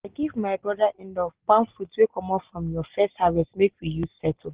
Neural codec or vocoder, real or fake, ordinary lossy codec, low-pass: none; real; Opus, 16 kbps; 3.6 kHz